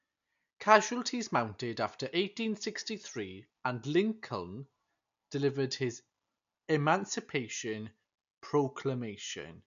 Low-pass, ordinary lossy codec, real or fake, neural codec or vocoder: 7.2 kHz; MP3, 64 kbps; real; none